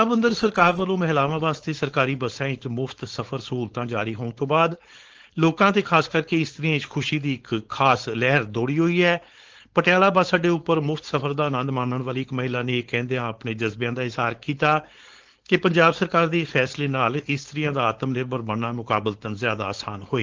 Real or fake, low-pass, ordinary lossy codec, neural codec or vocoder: fake; 7.2 kHz; Opus, 16 kbps; codec, 16 kHz, 4.8 kbps, FACodec